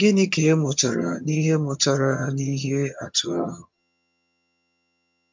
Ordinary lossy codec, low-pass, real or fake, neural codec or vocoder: MP3, 64 kbps; 7.2 kHz; fake; vocoder, 22.05 kHz, 80 mel bands, HiFi-GAN